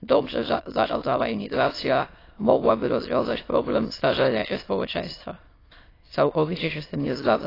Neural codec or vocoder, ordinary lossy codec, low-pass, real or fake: autoencoder, 22.05 kHz, a latent of 192 numbers a frame, VITS, trained on many speakers; AAC, 24 kbps; 5.4 kHz; fake